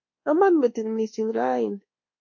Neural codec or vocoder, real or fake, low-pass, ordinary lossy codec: codec, 24 kHz, 1.2 kbps, DualCodec; fake; 7.2 kHz; MP3, 48 kbps